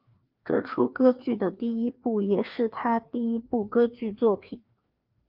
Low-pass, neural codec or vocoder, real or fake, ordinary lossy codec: 5.4 kHz; codec, 16 kHz, 2 kbps, FreqCodec, larger model; fake; Opus, 32 kbps